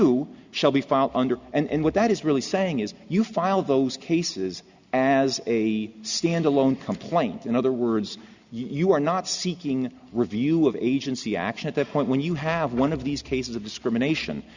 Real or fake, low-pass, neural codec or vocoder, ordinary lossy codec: real; 7.2 kHz; none; Opus, 64 kbps